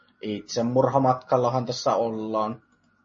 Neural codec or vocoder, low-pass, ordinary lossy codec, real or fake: none; 7.2 kHz; MP3, 32 kbps; real